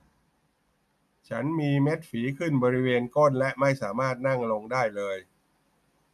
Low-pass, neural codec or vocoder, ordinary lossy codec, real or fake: 14.4 kHz; none; none; real